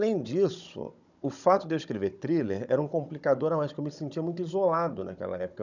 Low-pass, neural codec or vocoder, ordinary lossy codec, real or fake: 7.2 kHz; codec, 16 kHz, 16 kbps, FunCodec, trained on Chinese and English, 50 frames a second; none; fake